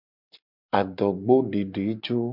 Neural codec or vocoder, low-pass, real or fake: none; 5.4 kHz; real